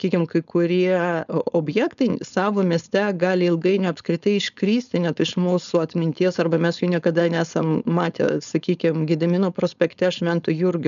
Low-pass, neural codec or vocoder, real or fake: 7.2 kHz; codec, 16 kHz, 4.8 kbps, FACodec; fake